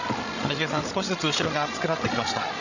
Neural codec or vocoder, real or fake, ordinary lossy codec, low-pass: codec, 16 kHz, 16 kbps, FreqCodec, larger model; fake; none; 7.2 kHz